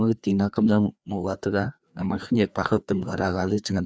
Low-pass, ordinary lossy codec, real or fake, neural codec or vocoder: none; none; fake; codec, 16 kHz, 2 kbps, FreqCodec, larger model